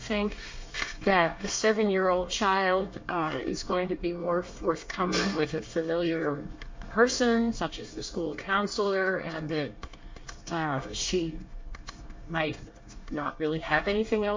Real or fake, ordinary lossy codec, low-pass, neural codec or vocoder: fake; MP3, 48 kbps; 7.2 kHz; codec, 24 kHz, 1 kbps, SNAC